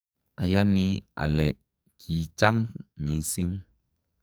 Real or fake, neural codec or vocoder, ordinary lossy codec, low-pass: fake; codec, 44.1 kHz, 2.6 kbps, SNAC; none; none